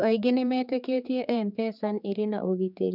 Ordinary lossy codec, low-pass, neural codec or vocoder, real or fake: none; 5.4 kHz; codec, 16 kHz, 4 kbps, X-Codec, HuBERT features, trained on general audio; fake